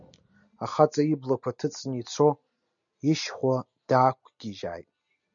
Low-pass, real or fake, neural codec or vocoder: 7.2 kHz; real; none